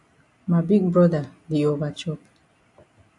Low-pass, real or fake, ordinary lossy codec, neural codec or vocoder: 10.8 kHz; real; AAC, 64 kbps; none